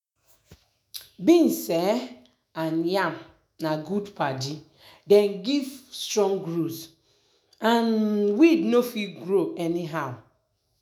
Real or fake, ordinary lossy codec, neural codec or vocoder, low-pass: fake; none; autoencoder, 48 kHz, 128 numbers a frame, DAC-VAE, trained on Japanese speech; none